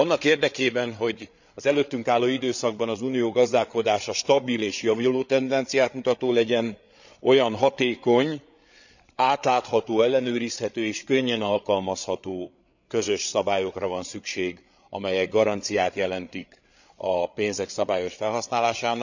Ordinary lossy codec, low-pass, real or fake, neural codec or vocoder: none; 7.2 kHz; fake; codec, 16 kHz, 8 kbps, FreqCodec, larger model